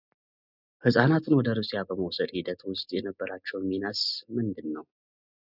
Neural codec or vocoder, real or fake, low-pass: none; real; 5.4 kHz